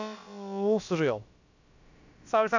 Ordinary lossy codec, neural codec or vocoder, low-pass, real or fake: none; codec, 16 kHz, about 1 kbps, DyCAST, with the encoder's durations; 7.2 kHz; fake